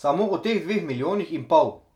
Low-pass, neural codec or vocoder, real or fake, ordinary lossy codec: 19.8 kHz; none; real; none